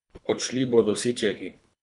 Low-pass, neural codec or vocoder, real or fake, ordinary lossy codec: 10.8 kHz; codec, 24 kHz, 3 kbps, HILCodec; fake; none